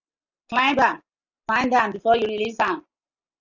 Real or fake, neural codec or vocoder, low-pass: real; none; 7.2 kHz